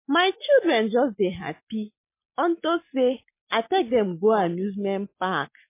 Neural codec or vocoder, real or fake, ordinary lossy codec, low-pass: none; real; MP3, 24 kbps; 3.6 kHz